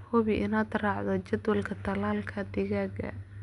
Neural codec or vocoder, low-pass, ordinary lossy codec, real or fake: none; 10.8 kHz; none; real